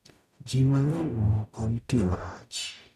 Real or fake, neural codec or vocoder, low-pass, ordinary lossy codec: fake; codec, 44.1 kHz, 0.9 kbps, DAC; 14.4 kHz; none